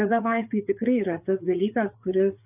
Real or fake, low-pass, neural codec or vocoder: fake; 3.6 kHz; codec, 16 kHz, 4 kbps, FreqCodec, larger model